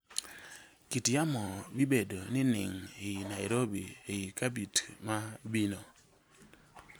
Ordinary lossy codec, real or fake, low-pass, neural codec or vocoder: none; real; none; none